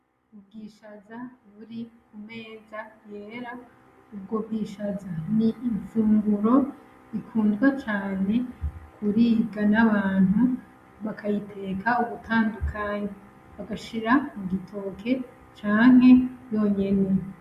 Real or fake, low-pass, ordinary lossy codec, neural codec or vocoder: real; 14.4 kHz; Opus, 64 kbps; none